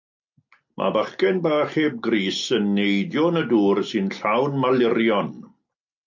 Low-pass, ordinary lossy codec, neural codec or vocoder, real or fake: 7.2 kHz; MP3, 48 kbps; none; real